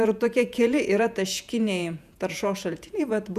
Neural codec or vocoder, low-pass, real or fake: vocoder, 48 kHz, 128 mel bands, Vocos; 14.4 kHz; fake